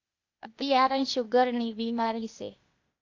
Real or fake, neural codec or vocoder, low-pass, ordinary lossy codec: fake; codec, 16 kHz, 0.8 kbps, ZipCodec; 7.2 kHz; AAC, 48 kbps